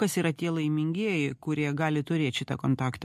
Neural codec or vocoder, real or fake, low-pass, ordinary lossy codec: none; real; 14.4 kHz; MP3, 64 kbps